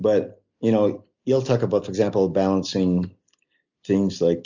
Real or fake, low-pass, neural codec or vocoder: real; 7.2 kHz; none